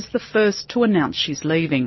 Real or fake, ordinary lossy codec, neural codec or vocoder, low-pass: real; MP3, 24 kbps; none; 7.2 kHz